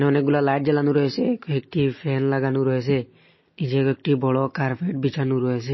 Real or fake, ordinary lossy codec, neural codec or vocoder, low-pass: real; MP3, 24 kbps; none; 7.2 kHz